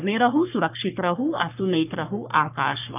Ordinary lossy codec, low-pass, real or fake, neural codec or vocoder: none; 3.6 kHz; fake; codec, 44.1 kHz, 3.4 kbps, Pupu-Codec